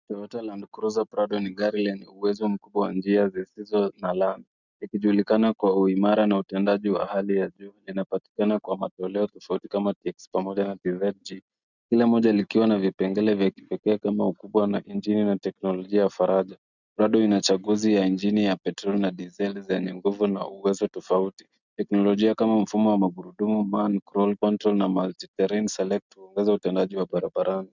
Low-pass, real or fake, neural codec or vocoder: 7.2 kHz; real; none